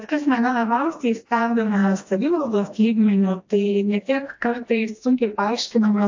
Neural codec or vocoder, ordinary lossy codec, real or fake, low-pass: codec, 16 kHz, 1 kbps, FreqCodec, smaller model; AAC, 48 kbps; fake; 7.2 kHz